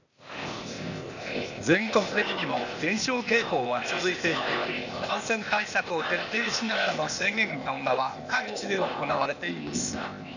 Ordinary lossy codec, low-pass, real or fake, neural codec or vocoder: none; 7.2 kHz; fake; codec, 16 kHz, 0.8 kbps, ZipCodec